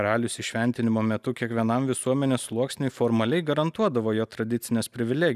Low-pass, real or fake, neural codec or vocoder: 14.4 kHz; real; none